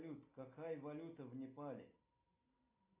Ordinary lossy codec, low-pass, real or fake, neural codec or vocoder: AAC, 32 kbps; 3.6 kHz; real; none